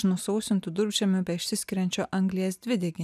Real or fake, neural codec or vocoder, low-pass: real; none; 14.4 kHz